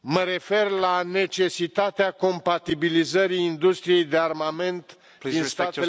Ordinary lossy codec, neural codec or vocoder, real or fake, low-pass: none; none; real; none